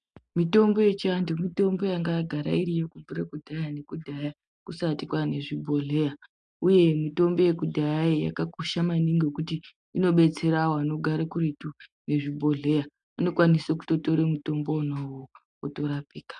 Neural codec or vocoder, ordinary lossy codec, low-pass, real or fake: none; MP3, 96 kbps; 9.9 kHz; real